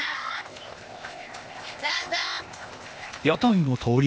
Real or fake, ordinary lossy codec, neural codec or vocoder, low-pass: fake; none; codec, 16 kHz, 0.8 kbps, ZipCodec; none